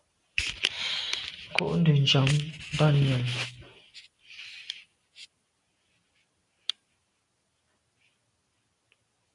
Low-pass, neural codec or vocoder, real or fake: 10.8 kHz; none; real